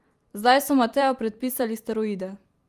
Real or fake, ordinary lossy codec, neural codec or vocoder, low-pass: fake; Opus, 32 kbps; vocoder, 44.1 kHz, 128 mel bands every 256 samples, BigVGAN v2; 14.4 kHz